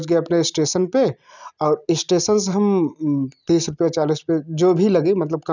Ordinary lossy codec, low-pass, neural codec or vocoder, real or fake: none; 7.2 kHz; none; real